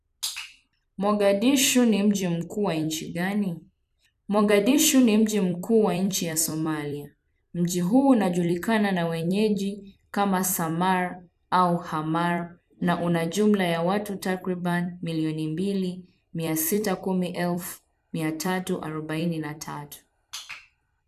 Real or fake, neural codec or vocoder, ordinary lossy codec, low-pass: fake; vocoder, 44.1 kHz, 128 mel bands every 256 samples, BigVGAN v2; none; 14.4 kHz